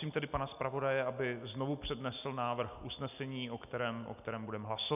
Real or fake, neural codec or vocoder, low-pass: real; none; 3.6 kHz